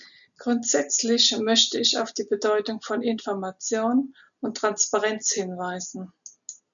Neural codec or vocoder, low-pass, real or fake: none; 7.2 kHz; real